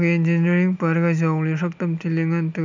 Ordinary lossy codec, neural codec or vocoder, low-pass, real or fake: none; none; 7.2 kHz; real